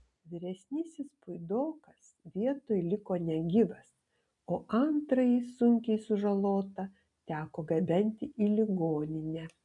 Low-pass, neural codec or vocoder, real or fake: 10.8 kHz; none; real